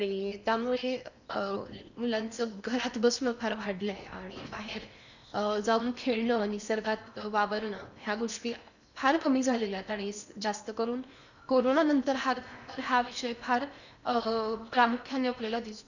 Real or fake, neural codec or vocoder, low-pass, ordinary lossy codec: fake; codec, 16 kHz in and 24 kHz out, 0.8 kbps, FocalCodec, streaming, 65536 codes; 7.2 kHz; none